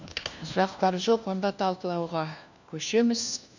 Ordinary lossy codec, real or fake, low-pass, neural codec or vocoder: none; fake; 7.2 kHz; codec, 16 kHz, 1 kbps, FunCodec, trained on LibriTTS, 50 frames a second